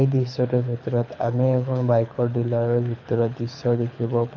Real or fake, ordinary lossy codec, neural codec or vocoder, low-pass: fake; none; codec, 24 kHz, 6 kbps, HILCodec; 7.2 kHz